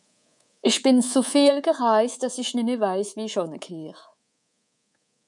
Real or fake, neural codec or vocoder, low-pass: fake; codec, 24 kHz, 3.1 kbps, DualCodec; 10.8 kHz